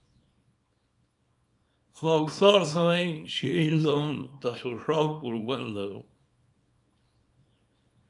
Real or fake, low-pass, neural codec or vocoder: fake; 10.8 kHz; codec, 24 kHz, 0.9 kbps, WavTokenizer, small release